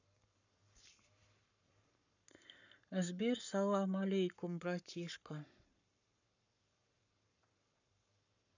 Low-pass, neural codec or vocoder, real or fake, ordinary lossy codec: 7.2 kHz; codec, 44.1 kHz, 7.8 kbps, Pupu-Codec; fake; none